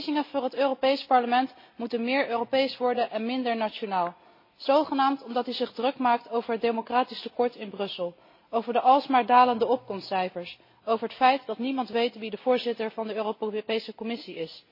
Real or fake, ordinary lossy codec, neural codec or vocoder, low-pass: real; MP3, 24 kbps; none; 5.4 kHz